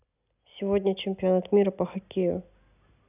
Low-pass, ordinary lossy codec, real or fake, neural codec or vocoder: 3.6 kHz; none; real; none